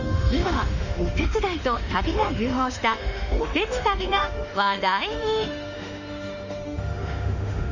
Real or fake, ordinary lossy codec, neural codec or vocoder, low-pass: fake; none; autoencoder, 48 kHz, 32 numbers a frame, DAC-VAE, trained on Japanese speech; 7.2 kHz